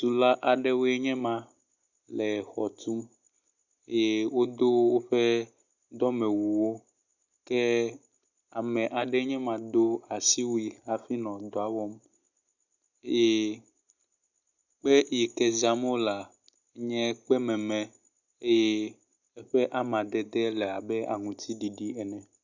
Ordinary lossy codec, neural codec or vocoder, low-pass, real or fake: Opus, 64 kbps; none; 7.2 kHz; real